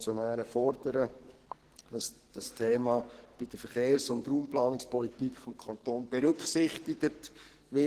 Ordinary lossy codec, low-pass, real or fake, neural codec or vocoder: Opus, 16 kbps; 14.4 kHz; fake; codec, 44.1 kHz, 2.6 kbps, SNAC